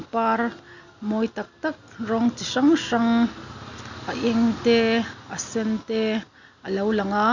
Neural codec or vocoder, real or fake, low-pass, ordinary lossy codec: none; real; 7.2 kHz; Opus, 64 kbps